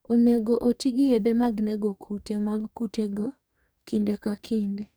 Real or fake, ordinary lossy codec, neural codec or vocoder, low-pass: fake; none; codec, 44.1 kHz, 2.6 kbps, DAC; none